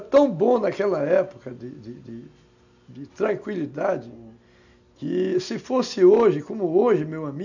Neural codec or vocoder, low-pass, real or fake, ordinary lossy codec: none; 7.2 kHz; real; none